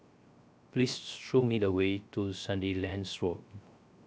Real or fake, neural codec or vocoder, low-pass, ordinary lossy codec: fake; codec, 16 kHz, 0.3 kbps, FocalCodec; none; none